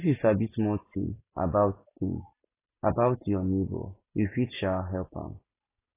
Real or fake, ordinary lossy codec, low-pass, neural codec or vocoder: real; AAC, 16 kbps; 3.6 kHz; none